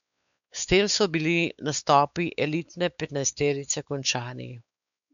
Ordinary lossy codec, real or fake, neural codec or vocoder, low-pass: none; fake; codec, 16 kHz, 4 kbps, X-Codec, WavLM features, trained on Multilingual LibriSpeech; 7.2 kHz